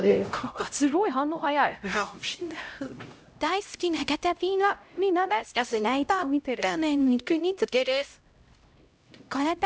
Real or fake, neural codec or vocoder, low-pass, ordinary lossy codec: fake; codec, 16 kHz, 0.5 kbps, X-Codec, HuBERT features, trained on LibriSpeech; none; none